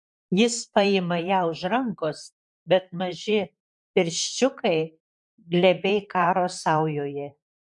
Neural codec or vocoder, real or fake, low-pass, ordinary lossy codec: vocoder, 44.1 kHz, 128 mel bands, Pupu-Vocoder; fake; 10.8 kHz; MP3, 96 kbps